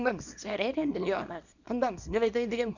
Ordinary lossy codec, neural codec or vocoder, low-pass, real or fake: none; codec, 24 kHz, 0.9 kbps, WavTokenizer, small release; 7.2 kHz; fake